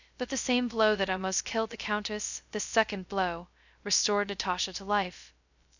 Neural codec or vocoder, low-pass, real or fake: codec, 16 kHz, 0.2 kbps, FocalCodec; 7.2 kHz; fake